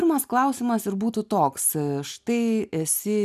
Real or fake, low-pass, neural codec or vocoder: real; 14.4 kHz; none